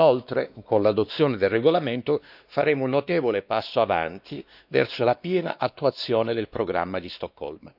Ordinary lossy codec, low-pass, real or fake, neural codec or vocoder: none; 5.4 kHz; fake; codec, 16 kHz, 2 kbps, X-Codec, WavLM features, trained on Multilingual LibriSpeech